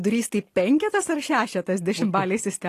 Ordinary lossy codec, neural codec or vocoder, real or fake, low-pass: AAC, 48 kbps; none; real; 14.4 kHz